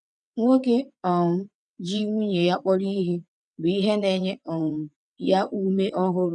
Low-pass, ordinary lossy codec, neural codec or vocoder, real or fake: 9.9 kHz; none; vocoder, 22.05 kHz, 80 mel bands, WaveNeXt; fake